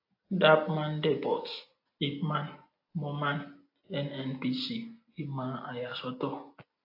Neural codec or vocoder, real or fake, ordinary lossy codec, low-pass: none; real; AAC, 32 kbps; 5.4 kHz